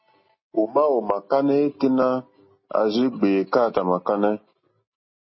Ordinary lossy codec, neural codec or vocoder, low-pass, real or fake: MP3, 24 kbps; none; 7.2 kHz; real